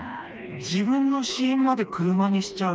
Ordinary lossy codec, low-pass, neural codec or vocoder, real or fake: none; none; codec, 16 kHz, 2 kbps, FreqCodec, smaller model; fake